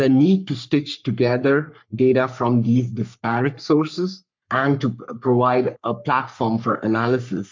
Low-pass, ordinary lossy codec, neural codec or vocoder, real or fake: 7.2 kHz; MP3, 64 kbps; codec, 44.1 kHz, 3.4 kbps, Pupu-Codec; fake